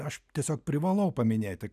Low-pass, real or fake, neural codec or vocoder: 14.4 kHz; real; none